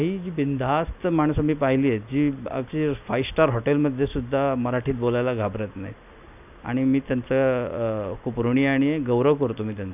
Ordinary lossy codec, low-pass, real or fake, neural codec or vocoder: none; 3.6 kHz; real; none